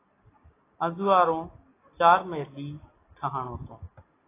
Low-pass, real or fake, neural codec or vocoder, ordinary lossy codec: 3.6 kHz; real; none; AAC, 16 kbps